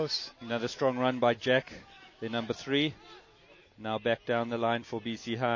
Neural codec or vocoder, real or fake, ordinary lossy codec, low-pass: none; real; MP3, 32 kbps; 7.2 kHz